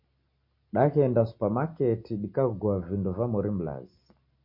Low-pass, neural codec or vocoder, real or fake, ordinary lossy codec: 5.4 kHz; none; real; MP3, 32 kbps